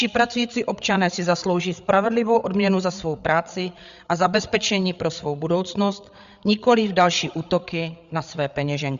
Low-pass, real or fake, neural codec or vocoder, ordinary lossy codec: 7.2 kHz; fake; codec, 16 kHz, 8 kbps, FreqCodec, larger model; Opus, 64 kbps